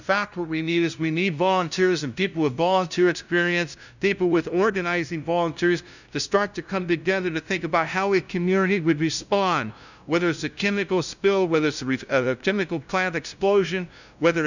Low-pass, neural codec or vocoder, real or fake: 7.2 kHz; codec, 16 kHz, 0.5 kbps, FunCodec, trained on LibriTTS, 25 frames a second; fake